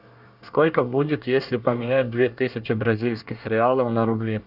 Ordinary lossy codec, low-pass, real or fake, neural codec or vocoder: Opus, 64 kbps; 5.4 kHz; fake; codec, 24 kHz, 1 kbps, SNAC